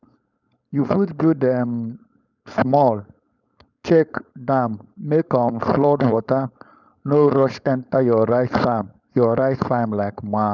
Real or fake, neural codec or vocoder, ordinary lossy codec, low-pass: fake; codec, 16 kHz, 4.8 kbps, FACodec; none; 7.2 kHz